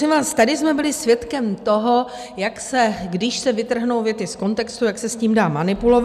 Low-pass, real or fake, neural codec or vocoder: 14.4 kHz; real; none